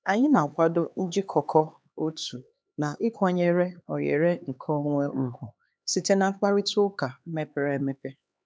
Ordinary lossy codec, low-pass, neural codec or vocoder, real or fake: none; none; codec, 16 kHz, 4 kbps, X-Codec, HuBERT features, trained on LibriSpeech; fake